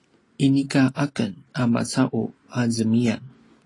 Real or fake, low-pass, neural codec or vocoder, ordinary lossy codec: real; 10.8 kHz; none; AAC, 32 kbps